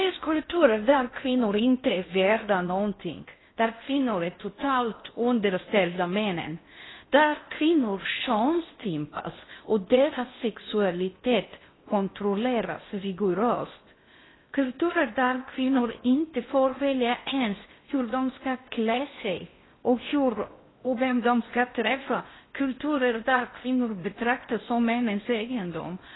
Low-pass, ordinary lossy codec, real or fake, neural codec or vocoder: 7.2 kHz; AAC, 16 kbps; fake; codec, 16 kHz in and 24 kHz out, 0.6 kbps, FocalCodec, streaming, 4096 codes